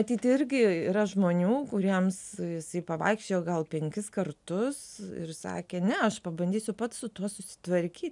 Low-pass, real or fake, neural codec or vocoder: 10.8 kHz; real; none